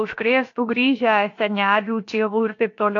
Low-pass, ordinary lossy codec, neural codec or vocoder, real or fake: 7.2 kHz; AAC, 48 kbps; codec, 16 kHz, about 1 kbps, DyCAST, with the encoder's durations; fake